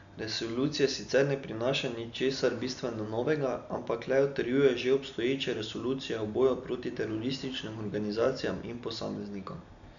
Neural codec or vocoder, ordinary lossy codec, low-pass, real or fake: none; none; 7.2 kHz; real